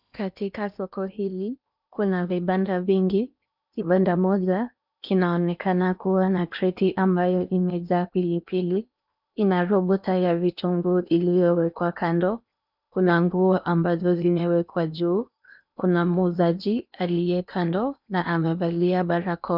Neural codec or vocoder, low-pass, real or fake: codec, 16 kHz in and 24 kHz out, 0.8 kbps, FocalCodec, streaming, 65536 codes; 5.4 kHz; fake